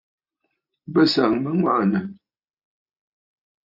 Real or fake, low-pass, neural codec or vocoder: real; 5.4 kHz; none